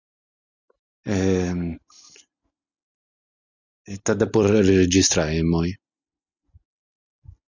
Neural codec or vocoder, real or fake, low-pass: none; real; 7.2 kHz